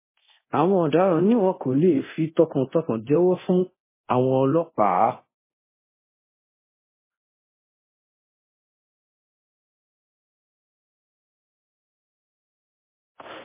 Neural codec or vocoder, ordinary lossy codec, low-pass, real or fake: codec, 24 kHz, 0.9 kbps, DualCodec; MP3, 16 kbps; 3.6 kHz; fake